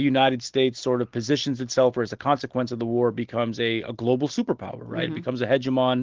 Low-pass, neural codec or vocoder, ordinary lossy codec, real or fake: 7.2 kHz; none; Opus, 16 kbps; real